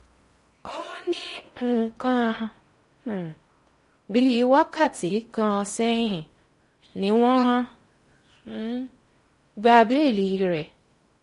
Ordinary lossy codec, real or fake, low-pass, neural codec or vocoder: MP3, 48 kbps; fake; 10.8 kHz; codec, 16 kHz in and 24 kHz out, 0.6 kbps, FocalCodec, streaming, 4096 codes